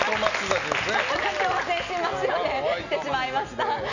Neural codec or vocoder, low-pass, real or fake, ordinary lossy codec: none; 7.2 kHz; real; none